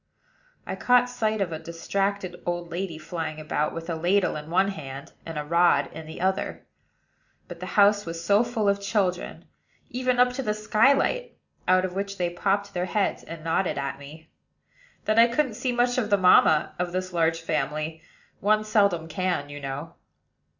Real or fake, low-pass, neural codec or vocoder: real; 7.2 kHz; none